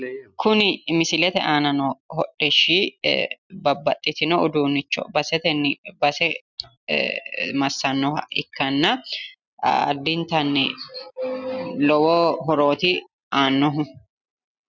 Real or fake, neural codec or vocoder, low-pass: real; none; 7.2 kHz